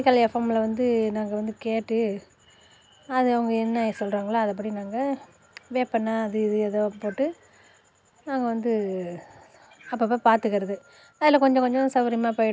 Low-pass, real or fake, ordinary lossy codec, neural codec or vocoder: none; real; none; none